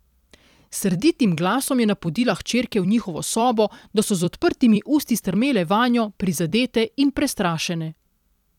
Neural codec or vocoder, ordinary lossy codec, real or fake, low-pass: vocoder, 44.1 kHz, 128 mel bands every 256 samples, BigVGAN v2; none; fake; 19.8 kHz